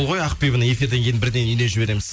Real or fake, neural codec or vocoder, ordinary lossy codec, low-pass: real; none; none; none